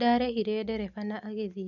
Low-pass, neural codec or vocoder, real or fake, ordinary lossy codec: 7.2 kHz; none; real; none